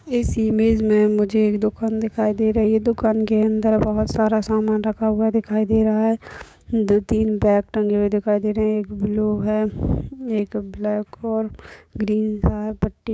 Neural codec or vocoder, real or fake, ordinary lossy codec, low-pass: codec, 16 kHz, 6 kbps, DAC; fake; none; none